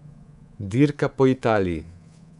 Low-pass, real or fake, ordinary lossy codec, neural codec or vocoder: 10.8 kHz; fake; none; codec, 24 kHz, 3.1 kbps, DualCodec